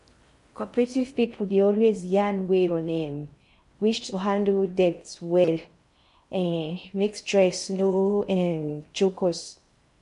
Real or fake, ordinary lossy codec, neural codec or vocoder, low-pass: fake; MP3, 64 kbps; codec, 16 kHz in and 24 kHz out, 0.6 kbps, FocalCodec, streaming, 4096 codes; 10.8 kHz